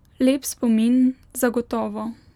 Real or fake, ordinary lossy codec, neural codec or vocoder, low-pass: real; none; none; 19.8 kHz